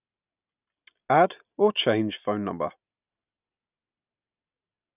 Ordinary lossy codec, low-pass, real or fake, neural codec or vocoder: none; 3.6 kHz; real; none